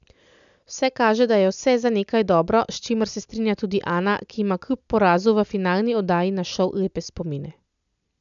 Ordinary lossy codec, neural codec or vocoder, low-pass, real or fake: none; none; 7.2 kHz; real